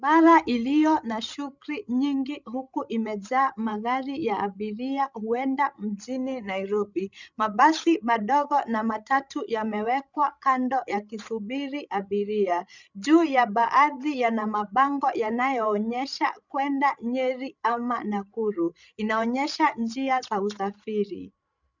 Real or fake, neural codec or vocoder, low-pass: fake; codec, 16 kHz, 16 kbps, FreqCodec, larger model; 7.2 kHz